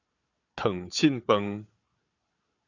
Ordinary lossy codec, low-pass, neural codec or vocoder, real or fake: Opus, 64 kbps; 7.2 kHz; codec, 16 kHz, 4 kbps, FunCodec, trained on Chinese and English, 50 frames a second; fake